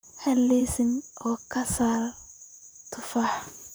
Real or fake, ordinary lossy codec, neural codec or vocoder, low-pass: fake; none; vocoder, 44.1 kHz, 128 mel bands, Pupu-Vocoder; none